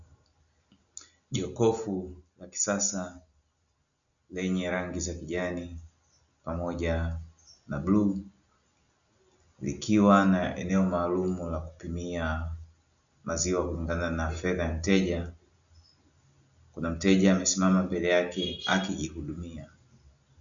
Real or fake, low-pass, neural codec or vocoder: real; 7.2 kHz; none